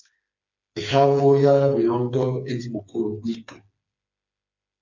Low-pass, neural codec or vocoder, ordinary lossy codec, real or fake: 7.2 kHz; codec, 16 kHz, 4 kbps, FreqCodec, smaller model; MP3, 64 kbps; fake